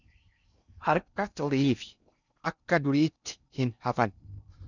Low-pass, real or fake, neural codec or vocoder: 7.2 kHz; fake; codec, 16 kHz in and 24 kHz out, 0.6 kbps, FocalCodec, streaming, 4096 codes